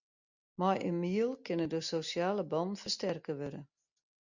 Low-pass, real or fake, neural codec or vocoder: 7.2 kHz; real; none